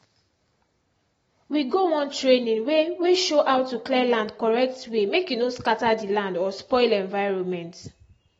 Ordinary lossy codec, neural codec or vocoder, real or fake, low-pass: AAC, 24 kbps; none; real; 19.8 kHz